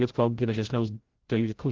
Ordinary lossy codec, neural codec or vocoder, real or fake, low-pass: Opus, 16 kbps; codec, 16 kHz, 0.5 kbps, FreqCodec, larger model; fake; 7.2 kHz